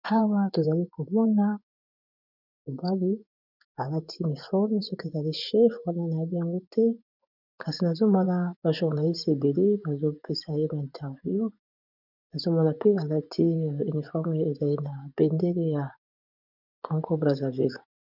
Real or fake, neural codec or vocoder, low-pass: fake; vocoder, 24 kHz, 100 mel bands, Vocos; 5.4 kHz